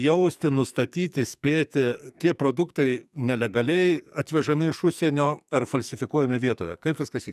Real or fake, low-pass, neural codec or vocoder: fake; 14.4 kHz; codec, 44.1 kHz, 2.6 kbps, SNAC